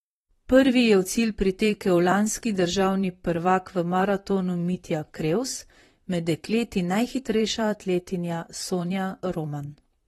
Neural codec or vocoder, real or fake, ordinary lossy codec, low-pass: vocoder, 44.1 kHz, 128 mel bands, Pupu-Vocoder; fake; AAC, 32 kbps; 19.8 kHz